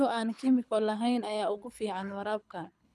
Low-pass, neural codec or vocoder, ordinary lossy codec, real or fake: none; codec, 24 kHz, 6 kbps, HILCodec; none; fake